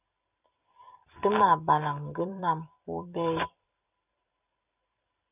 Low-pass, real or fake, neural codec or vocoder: 3.6 kHz; fake; vocoder, 44.1 kHz, 128 mel bands every 256 samples, BigVGAN v2